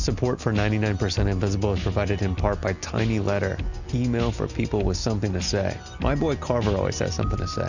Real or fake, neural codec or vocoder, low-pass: real; none; 7.2 kHz